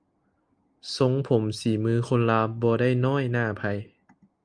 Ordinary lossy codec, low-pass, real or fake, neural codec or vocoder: Opus, 32 kbps; 9.9 kHz; real; none